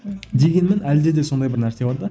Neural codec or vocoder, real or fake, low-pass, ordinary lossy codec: none; real; none; none